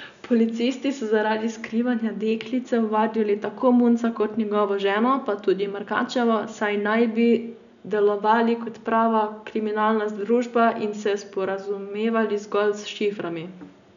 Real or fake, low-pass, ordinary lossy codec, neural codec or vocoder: real; 7.2 kHz; none; none